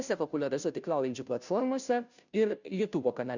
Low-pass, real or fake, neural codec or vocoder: 7.2 kHz; fake; codec, 16 kHz, 0.5 kbps, FunCodec, trained on Chinese and English, 25 frames a second